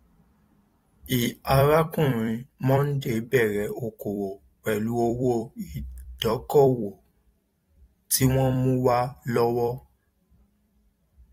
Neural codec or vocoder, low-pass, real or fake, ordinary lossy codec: vocoder, 44.1 kHz, 128 mel bands every 256 samples, BigVGAN v2; 19.8 kHz; fake; AAC, 48 kbps